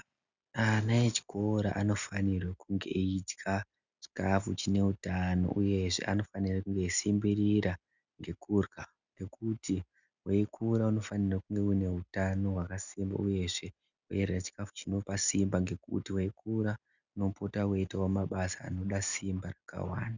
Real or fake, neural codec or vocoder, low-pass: real; none; 7.2 kHz